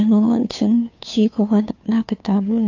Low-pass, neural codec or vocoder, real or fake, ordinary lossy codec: 7.2 kHz; codec, 16 kHz, 2 kbps, FunCodec, trained on Chinese and English, 25 frames a second; fake; AAC, 48 kbps